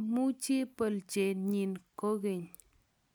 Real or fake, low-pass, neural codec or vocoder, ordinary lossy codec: real; none; none; none